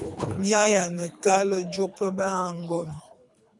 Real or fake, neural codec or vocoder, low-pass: fake; codec, 24 kHz, 3 kbps, HILCodec; 10.8 kHz